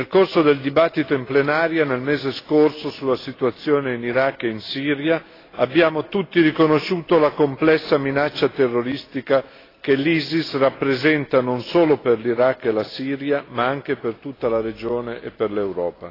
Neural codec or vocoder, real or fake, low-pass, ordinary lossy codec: none; real; 5.4 kHz; AAC, 24 kbps